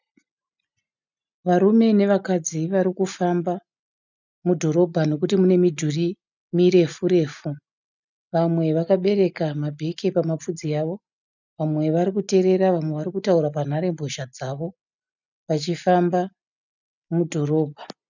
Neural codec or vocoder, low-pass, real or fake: none; 7.2 kHz; real